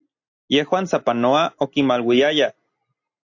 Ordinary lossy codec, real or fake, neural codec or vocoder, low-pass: AAC, 48 kbps; real; none; 7.2 kHz